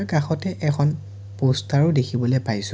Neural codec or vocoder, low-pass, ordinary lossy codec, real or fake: none; none; none; real